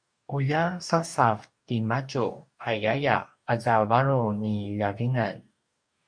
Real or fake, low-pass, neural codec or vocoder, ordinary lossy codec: fake; 9.9 kHz; codec, 44.1 kHz, 2.6 kbps, DAC; MP3, 64 kbps